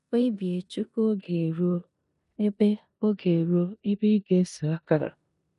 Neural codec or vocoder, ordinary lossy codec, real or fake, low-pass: codec, 16 kHz in and 24 kHz out, 0.9 kbps, LongCat-Audio-Codec, four codebook decoder; none; fake; 10.8 kHz